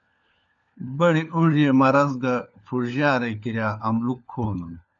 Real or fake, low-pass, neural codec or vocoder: fake; 7.2 kHz; codec, 16 kHz, 4 kbps, FunCodec, trained on LibriTTS, 50 frames a second